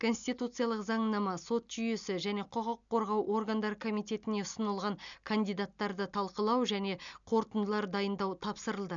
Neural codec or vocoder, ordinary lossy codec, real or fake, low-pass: none; none; real; 7.2 kHz